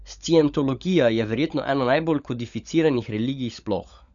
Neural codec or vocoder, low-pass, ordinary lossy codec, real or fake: codec, 16 kHz, 16 kbps, FunCodec, trained on LibriTTS, 50 frames a second; 7.2 kHz; none; fake